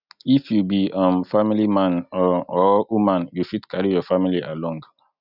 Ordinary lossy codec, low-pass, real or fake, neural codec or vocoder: none; 5.4 kHz; real; none